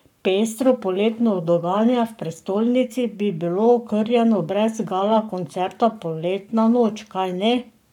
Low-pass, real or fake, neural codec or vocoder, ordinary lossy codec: 19.8 kHz; fake; codec, 44.1 kHz, 7.8 kbps, Pupu-Codec; none